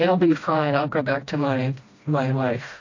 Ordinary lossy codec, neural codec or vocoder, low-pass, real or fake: AAC, 48 kbps; codec, 16 kHz, 1 kbps, FreqCodec, smaller model; 7.2 kHz; fake